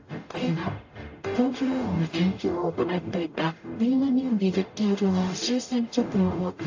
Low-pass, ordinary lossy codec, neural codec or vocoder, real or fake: 7.2 kHz; AAC, 48 kbps; codec, 44.1 kHz, 0.9 kbps, DAC; fake